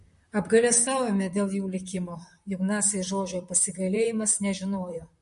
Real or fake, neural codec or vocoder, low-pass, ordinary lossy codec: fake; vocoder, 44.1 kHz, 128 mel bands, Pupu-Vocoder; 14.4 kHz; MP3, 48 kbps